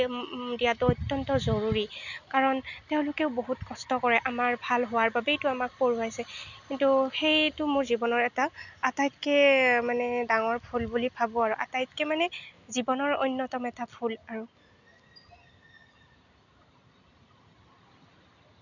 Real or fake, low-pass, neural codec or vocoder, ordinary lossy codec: real; 7.2 kHz; none; none